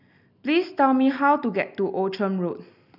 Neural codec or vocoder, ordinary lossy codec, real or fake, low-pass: none; none; real; 5.4 kHz